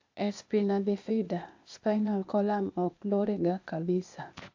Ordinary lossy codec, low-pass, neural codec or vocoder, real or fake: AAC, 48 kbps; 7.2 kHz; codec, 16 kHz, 0.8 kbps, ZipCodec; fake